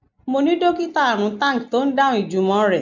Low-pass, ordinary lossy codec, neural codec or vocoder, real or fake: 7.2 kHz; none; none; real